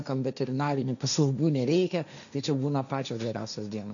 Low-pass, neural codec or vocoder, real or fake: 7.2 kHz; codec, 16 kHz, 1.1 kbps, Voila-Tokenizer; fake